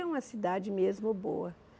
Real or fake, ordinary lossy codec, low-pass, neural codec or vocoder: real; none; none; none